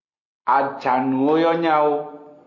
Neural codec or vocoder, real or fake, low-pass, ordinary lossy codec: none; real; 7.2 kHz; MP3, 48 kbps